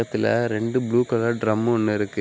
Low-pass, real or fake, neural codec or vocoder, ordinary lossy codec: none; real; none; none